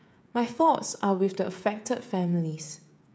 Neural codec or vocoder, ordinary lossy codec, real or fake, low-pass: codec, 16 kHz, 16 kbps, FreqCodec, smaller model; none; fake; none